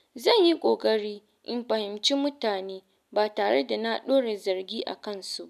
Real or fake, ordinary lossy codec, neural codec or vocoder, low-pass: real; none; none; 14.4 kHz